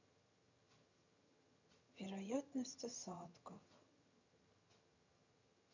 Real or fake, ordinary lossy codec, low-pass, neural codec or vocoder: fake; none; 7.2 kHz; vocoder, 22.05 kHz, 80 mel bands, HiFi-GAN